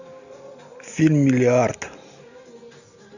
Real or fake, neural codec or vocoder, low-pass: real; none; 7.2 kHz